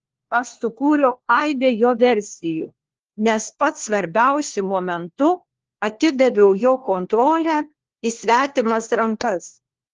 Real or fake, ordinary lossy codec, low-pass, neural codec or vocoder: fake; Opus, 16 kbps; 7.2 kHz; codec, 16 kHz, 1 kbps, FunCodec, trained on LibriTTS, 50 frames a second